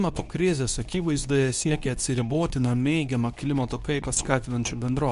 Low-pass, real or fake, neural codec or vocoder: 10.8 kHz; fake; codec, 24 kHz, 0.9 kbps, WavTokenizer, medium speech release version 2